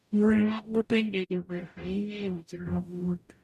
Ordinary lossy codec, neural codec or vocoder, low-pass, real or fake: none; codec, 44.1 kHz, 0.9 kbps, DAC; 14.4 kHz; fake